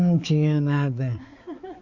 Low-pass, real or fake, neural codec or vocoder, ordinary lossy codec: 7.2 kHz; fake; codec, 16 kHz, 4 kbps, X-Codec, HuBERT features, trained on balanced general audio; Opus, 64 kbps